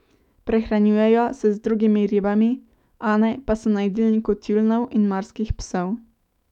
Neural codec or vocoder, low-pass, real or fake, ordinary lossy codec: autoencoder, 48 kHz, 128 numbers a frame, DAC-VAE, trained on Japanese speech; 19.8 kHz; fake; none